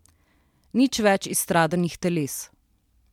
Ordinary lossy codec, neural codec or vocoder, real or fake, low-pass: MP3, 96 kbps; none; real; 19.8 kHz